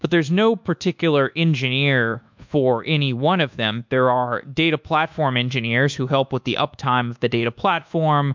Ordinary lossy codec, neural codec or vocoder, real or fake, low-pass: MP3, 64 kbps; codec, 24 kHz, 1.2 kbps, DualCodec; fake; 7.2 kHz